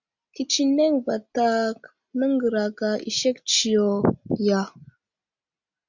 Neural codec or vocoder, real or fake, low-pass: none; real; 7.2 kHz